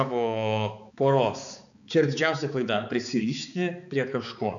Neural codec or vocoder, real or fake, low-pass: codec, 16 kHz, 4 kbps, X-Codec, HuBERT features, trained on balanced general audio; fake; 7.2 kHz